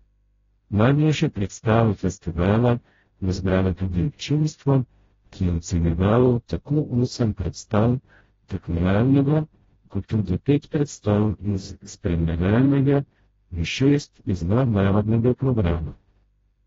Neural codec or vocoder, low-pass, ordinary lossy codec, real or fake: codec, 16 kHz, 0.5 kbps, FreqCodec, smaller model; 7.2 kHz; AAC, 24 kbps; fake